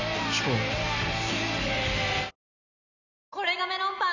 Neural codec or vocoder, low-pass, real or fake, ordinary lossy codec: none; 7.2 kHz; real; none